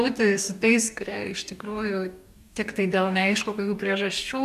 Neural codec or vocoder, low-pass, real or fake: codec, 44.1 kHz, 2.6 kbps, DAC; 14.4 kHz; fake